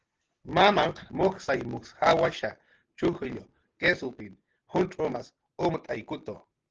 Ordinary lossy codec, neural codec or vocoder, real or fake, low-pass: Opus, 16 kbps; none; real; 7.2 kHz